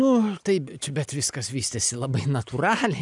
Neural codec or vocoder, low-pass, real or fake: none; 10.8 kHz; real